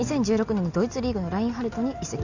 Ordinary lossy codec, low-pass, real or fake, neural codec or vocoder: none; 7.2 kHz; real; none